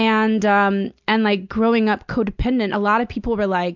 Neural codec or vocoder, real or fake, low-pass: none; real; 7.2 kHz